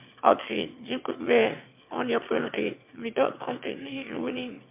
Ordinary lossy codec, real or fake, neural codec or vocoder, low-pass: MP3, 32 kbps; fake; autoencoder, 22.05 kHz, a latent of 192 numbers a frame, VITS, trained on one speaker; 3.6 kHz